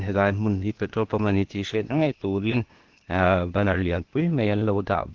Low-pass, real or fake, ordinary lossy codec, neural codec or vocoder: 7.2 kHz; fake; Opus, 16 kbps; codec, 16 kHz, 0.8 kbps, ZipCodec